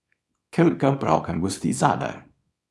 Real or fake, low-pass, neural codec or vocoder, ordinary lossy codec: fake; none; codec, 24 kHz, 0.9 kbps, WavTokenizer, small release; none